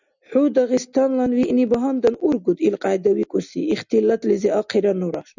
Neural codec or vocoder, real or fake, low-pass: none; real; 7.2 kHz